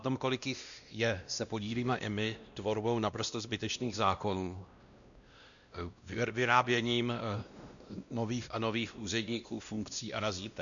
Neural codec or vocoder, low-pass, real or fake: codec, 16 kHz, 1 kbps, X-Codec, WavLM features, trained on Multilingual LibriSpeech; 7.2 kHz; fake